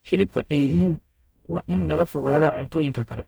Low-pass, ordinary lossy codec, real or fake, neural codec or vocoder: none; none; fake; codec, 44.1 kHz, 0.9 kbps, DAC